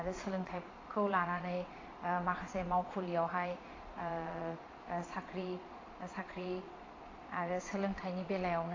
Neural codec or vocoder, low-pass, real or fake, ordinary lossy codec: vocoder, 22.05 kHz, 80 mel bands, Vocos; 7.2 kHz; fake; AAC, 32 kbps